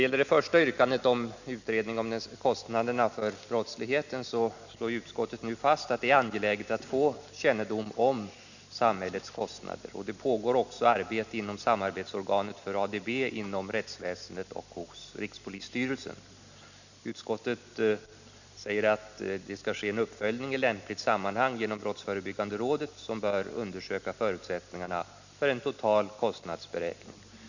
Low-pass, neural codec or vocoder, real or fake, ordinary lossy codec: 7.2 kHz; none; real; none